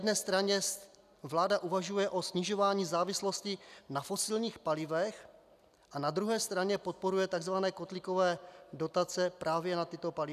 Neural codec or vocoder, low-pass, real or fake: none; 14.4 kHz; real